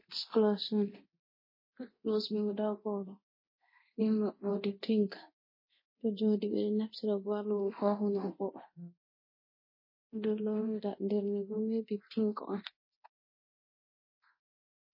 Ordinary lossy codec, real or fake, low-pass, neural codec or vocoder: MP3, 24 kbps; fake; 5.4 kHz; codec, 24 kHz, 0.9 kbps, DualCodec